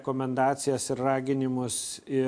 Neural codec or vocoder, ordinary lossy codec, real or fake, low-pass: none; AAC, 64 kbps; real; 9.9 kHz